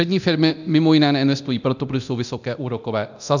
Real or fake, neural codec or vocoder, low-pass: fake; codec, 24 kHz, 0.9 kbps, DualCodec; 7.2 kHz